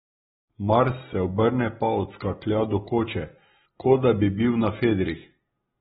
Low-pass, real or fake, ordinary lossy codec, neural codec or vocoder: 7.2 kHz; real; AAC, 16 kbps; none